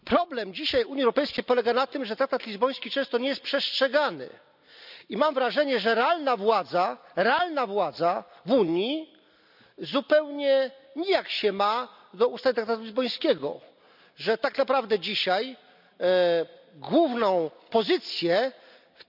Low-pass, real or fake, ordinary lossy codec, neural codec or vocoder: 5.4 kHz; real; none; none